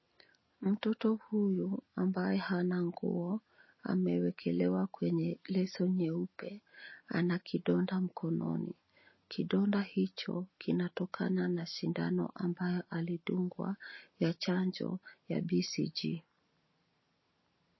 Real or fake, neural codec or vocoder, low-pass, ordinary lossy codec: real; none; 7.2 kHz; MP3, 24 kbps